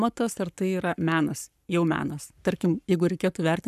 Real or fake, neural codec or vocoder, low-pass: fake; codec, 44.1 kHz, 7.8 kbps, Pupu-Codec; 14.4 kHz